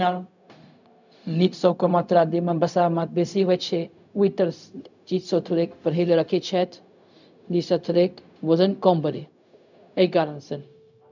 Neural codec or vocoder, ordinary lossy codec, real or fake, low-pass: codec, 16 kHz, 0.4 kbps, LongCat-Audio-Codec; none; fake; 7.2 kHz